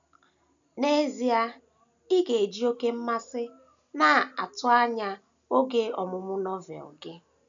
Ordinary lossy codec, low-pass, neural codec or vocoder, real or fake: none; 7.2 kHz; none; real